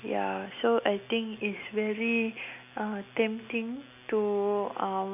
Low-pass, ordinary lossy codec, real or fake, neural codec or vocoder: 3.6 kHz; none; real; none